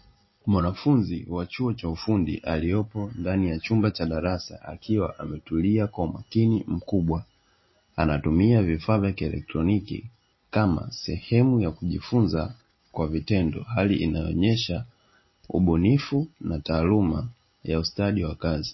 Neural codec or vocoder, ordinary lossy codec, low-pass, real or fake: none; MP3, 24 kbps; 7.2 kHz; real